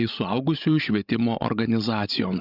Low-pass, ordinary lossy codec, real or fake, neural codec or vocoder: 5.4 kHz; Opus, 64 kbps; fake; codec, 16 kHz, 16 kbps, FreqCodec, larger model